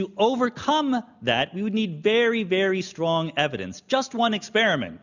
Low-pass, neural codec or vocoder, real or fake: 7.2 kHz; none; real